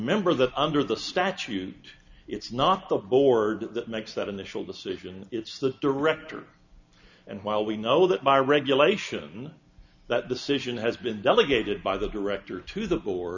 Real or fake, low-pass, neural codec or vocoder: real; 7.2 kHz; none